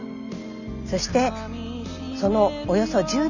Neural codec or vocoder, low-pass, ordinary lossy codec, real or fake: none; 7.2 kHz; none; real